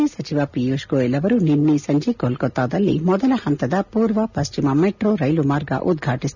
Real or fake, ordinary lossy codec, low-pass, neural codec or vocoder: real; none; 7.2 kHz; none